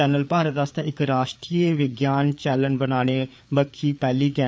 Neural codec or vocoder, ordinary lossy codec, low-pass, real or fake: codec, 16 kHz, 4 kbps, FreqCodec, larger model; none; none; fake